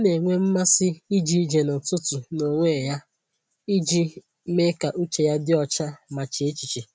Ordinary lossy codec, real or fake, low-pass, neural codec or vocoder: none; real; none; none